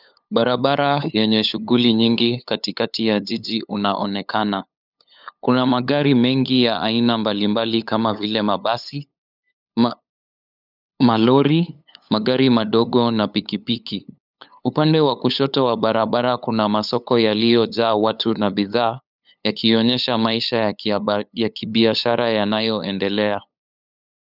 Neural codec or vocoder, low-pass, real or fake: codec, 16 kHz, 8 kbps, FunCodec, trained on LibriTTS, 25 frames a second; 5.4 kHz; fake